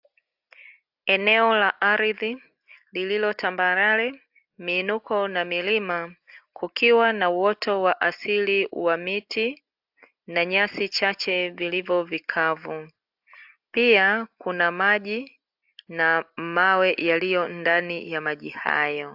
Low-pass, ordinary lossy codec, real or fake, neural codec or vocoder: 5.4 kHz; AAC, 48 kbps; real; none